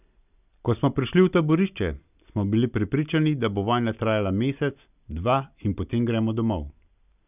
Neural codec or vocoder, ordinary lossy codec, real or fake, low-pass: none; none; real; 3.6 kHz